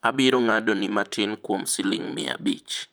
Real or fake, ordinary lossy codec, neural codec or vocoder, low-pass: fake; none; vocoder, 44.1 kHz, 128 mel bands, Pupu-Vocoder; none